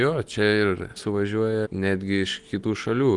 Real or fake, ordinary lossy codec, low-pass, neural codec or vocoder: real; Opus, 24 kbps; 10.8 kHz; none